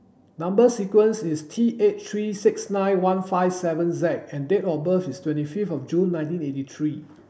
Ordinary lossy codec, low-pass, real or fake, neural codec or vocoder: none; none; real; none